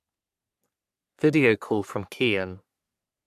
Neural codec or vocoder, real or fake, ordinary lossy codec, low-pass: codec, 44.1 kHz, 3.4 kbps, Pupu-Codec; fake; none; 14.4 kHz